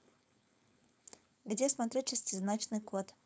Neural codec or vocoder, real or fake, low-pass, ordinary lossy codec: codec, 16 kHz, 4.8 kbps, FACodec; fake; none; none